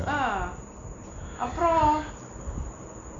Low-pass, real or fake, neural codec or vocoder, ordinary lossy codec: 7.2 kHz; real; none; none